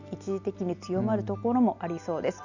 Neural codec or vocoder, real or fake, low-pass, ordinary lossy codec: none; real; 7.2 kHz; none